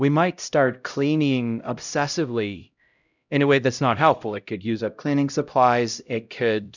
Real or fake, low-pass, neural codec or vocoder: fake; 7.2 kHz; codec, 16 kHz, 0.5 kbps, X-Codec, HuBERT features, trained on LibriSpeech